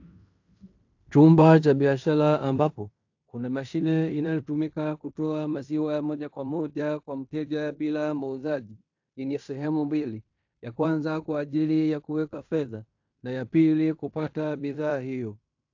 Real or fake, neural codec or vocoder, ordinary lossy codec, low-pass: fake; codec, 16 kHz in and 24 kHz out, 0.9 kbps, LongCat-Audio-Codec, fine tuned four codebook decoder; AAC, 48 kbps; 7.2 kHz